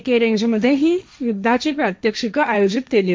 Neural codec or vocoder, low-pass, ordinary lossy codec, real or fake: codec, 16 kHz, 1.1 kbps, Voila-Tokenizer; none; none; fake